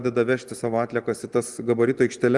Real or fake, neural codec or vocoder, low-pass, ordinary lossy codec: real; none; 10.8 kHz; Opus, 24 kbps